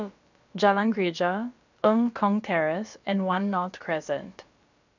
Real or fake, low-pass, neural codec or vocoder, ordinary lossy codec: fake; 7.2 kHz; codec, 16 kHz, about 1 kbps, DyCAST, with the encoder's durations; none